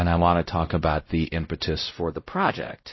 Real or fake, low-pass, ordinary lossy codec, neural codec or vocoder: fake; 7.2 kHz; MP3, 24 kbps; codec, 16 kHz, 0.5 kbps, X-Codec, WavLM features, trained on Multilingual LibriSpeech